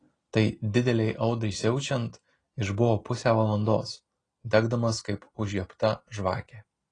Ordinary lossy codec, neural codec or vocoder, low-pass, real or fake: AAC, 32 kbps; none; 9.9 kHz; real